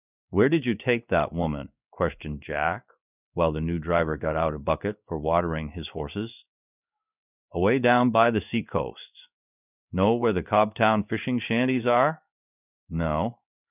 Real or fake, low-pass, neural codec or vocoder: fake; 3.6 kHz; codec, 16 kHz in and 24 kHz out, 1 kbps, XY-Tokenizer